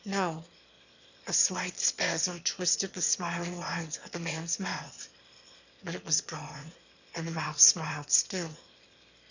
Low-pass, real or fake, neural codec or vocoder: 7.2 kHz; fake; autoencoder, 22.05 kHz, a latent of 192 numbers a frame, VITS, trained on one speaker